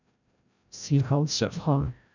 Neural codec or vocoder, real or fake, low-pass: codec, 16 kHz, 0.5 kbps, FreqCodec, larger model; fake; 7.2 kHz